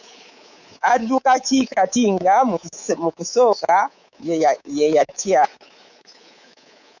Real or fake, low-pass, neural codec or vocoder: fake; 7.2 kHz; codec, 24 kHz, 3.1 kbps, DualCodec